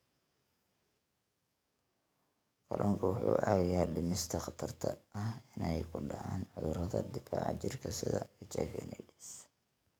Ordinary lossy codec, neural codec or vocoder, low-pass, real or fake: none; codec, 44.1 kHz, 7.8 kbps, DAC; none; fake